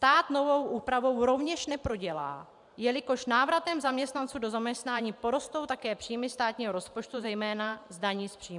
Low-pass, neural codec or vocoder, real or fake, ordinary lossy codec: 10.8 kHz; vocoder, 44.1 kHz, 128 mel bands every 512 samples, BigVGAN v2; fake; MP3, 96 kbps